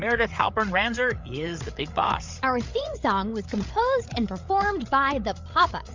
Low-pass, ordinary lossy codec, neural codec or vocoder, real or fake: 7.2 kHz; MP3, 64 kbps; codec, 16 kHz, 8 kbps, FreqCodec, larger model; fake